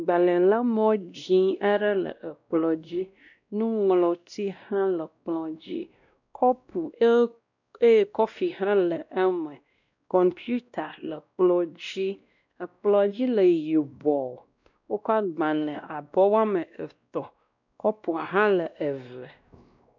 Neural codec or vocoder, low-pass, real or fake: codec, 16 kHz, 1 kbps, X-Codec, WavLM features, trained on Multilingual LibriSpeech; 7.2 kHz; fake